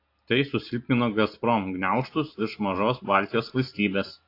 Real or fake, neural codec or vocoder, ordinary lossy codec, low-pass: real; none; AAC, 32 kbps; 5.4 kHz